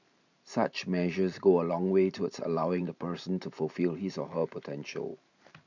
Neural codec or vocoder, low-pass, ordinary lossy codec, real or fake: vocoder, 44.1 kHz, 128 mel bands every 512 samples, BigVGAN v2; 7.2 kHz; none; fake